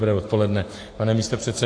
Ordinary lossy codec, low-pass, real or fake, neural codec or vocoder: AAC, 48 kbps; 9.9 kHz; real; none